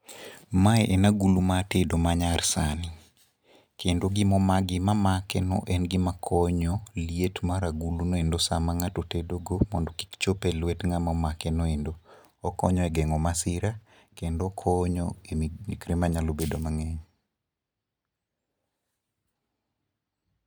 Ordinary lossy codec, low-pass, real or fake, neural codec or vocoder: none; none; real; none